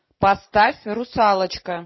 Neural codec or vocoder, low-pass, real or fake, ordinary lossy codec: none; 7.2 kHz; real; MP3, 24 kbps